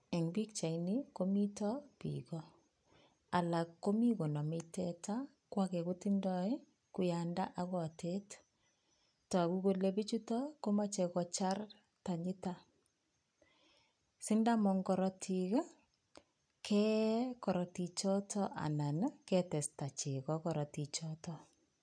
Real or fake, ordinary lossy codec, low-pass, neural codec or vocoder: real; none; 9.9 kHz; none